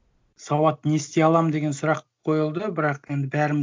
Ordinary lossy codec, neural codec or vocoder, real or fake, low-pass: none; none; real; none